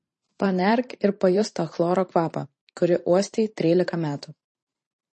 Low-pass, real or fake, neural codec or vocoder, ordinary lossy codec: 10.8 kHz; fake; vocoder, 44.1 kHz, 128 mel bands every 512 samples, BigVGAN v2; MP3, 32 kbps